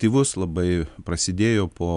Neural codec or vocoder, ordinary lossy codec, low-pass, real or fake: none; MP3, 96 kbps; 10.8 kHz; real